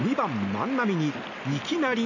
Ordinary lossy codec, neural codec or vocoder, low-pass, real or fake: none; none; 7.2 kHz; real